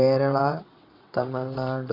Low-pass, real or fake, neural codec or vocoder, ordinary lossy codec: 5.4 kHz; fake; vocoder, 22.05 kHz, 80 mel bands, WaveNeXt; AAC, 32 kbps